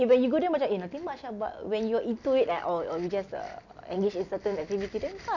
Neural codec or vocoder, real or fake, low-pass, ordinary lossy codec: none; real; 7.2 kHz; none